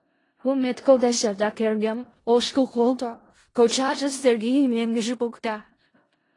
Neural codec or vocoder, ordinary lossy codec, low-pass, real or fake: codec, 16 kHz in and 24 kHz out, 0.4 kbps, LongCat-Audio-Codec, four codebook decoder; AAC, 32 kbps; 10.8 kHz; fake